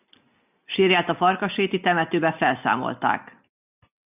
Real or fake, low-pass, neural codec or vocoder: real; 3.6 kHz; none